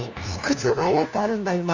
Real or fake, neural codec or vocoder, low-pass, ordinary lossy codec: fake; codec, 16 kHz, 1 kbps, FunCodec, trained on LibriTTS, 50 frames a second; 7.2 kHz; none